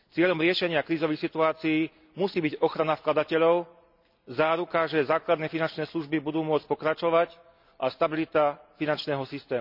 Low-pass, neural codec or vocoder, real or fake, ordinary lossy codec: 5.4 kHz; none; real; none